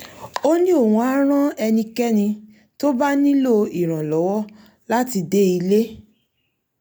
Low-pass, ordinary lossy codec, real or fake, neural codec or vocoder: none; none; real; none